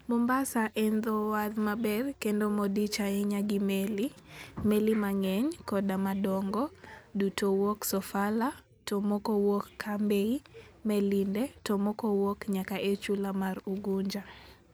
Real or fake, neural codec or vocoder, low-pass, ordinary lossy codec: real; none; none; none